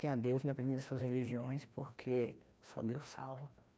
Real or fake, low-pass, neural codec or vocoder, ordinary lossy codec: fake; none; codec, 16 kHz, 1 kbps, FreqCodec, larger model; none